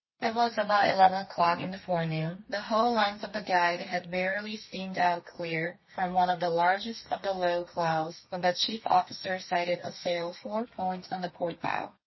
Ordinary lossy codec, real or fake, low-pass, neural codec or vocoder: MP3, 24 kbps; fake; 7.2 kHz; codec, 32 kHz, 1.9 kbps, SNAC